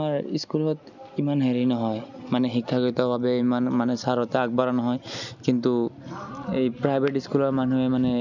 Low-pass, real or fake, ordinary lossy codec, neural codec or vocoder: 7.2 kHz; real; none; none